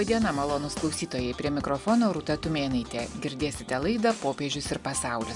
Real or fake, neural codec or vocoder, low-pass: real; none; 10.8 kHz